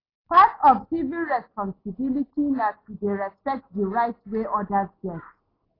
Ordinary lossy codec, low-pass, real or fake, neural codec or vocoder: AAC, 24 kbps; 5.4 kHz; real; none